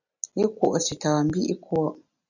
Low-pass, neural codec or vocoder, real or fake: 7.2 kHz; none; real